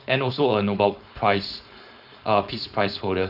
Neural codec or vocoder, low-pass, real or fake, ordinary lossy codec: codec, 16 kHz, 4.8 kbps, FACodec; 5.4 kHz; fake; none